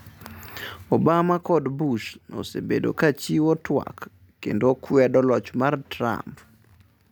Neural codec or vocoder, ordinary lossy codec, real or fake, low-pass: none; none; real; none